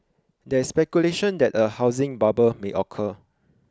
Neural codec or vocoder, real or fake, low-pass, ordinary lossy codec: none; real; none; none